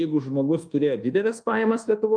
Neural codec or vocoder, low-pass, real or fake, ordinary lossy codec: codec, 24 kHz, 1.2 kbps, DualCodec; 9.9 kHz; fake; Opus, 32 kbps